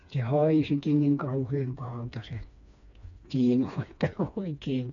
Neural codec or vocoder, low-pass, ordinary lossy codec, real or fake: codec, 16 kHz, 2 kbps, FreqCodec, smaller model; 7.2 kHz; none; fake